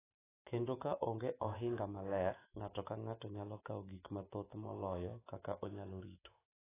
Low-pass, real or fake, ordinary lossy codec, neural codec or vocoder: 3.6 kHz; fake; AAC, 16 kbps; autoencoder, 48 kHz, 128 numbers a frame, DAC-VAE, trained on Japanese speech